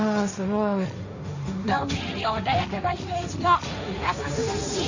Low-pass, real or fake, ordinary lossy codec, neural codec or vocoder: 7.2 kHz; fake; none; codec, 16 kHz, 1.1 kbps, Voila-Tokenizer